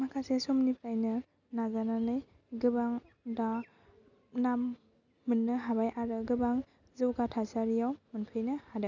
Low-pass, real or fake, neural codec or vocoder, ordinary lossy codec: 7.2 kHz; real; none; none